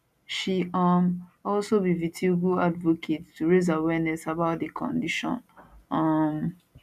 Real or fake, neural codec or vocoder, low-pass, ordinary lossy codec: real; none; 14.4 kHz; none